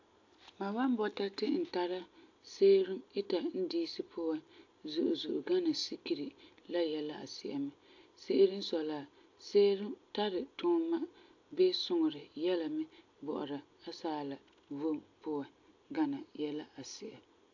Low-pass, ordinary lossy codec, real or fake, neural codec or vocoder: 7.2 kHz; AAC, 48 kbps; real; none